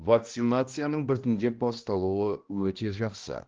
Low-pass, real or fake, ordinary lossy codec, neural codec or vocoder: 7.2 kHz; fake; Opus, 16 kbps; codec, 16 kHz, 1 kbps, X-Codec, HuBERT features, trained on balanced general audio